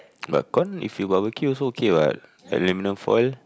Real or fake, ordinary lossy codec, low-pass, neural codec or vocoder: real; none; none; none